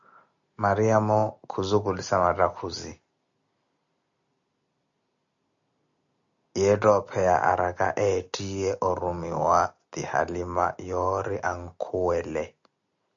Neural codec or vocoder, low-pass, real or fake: none; 7.2 kHz; real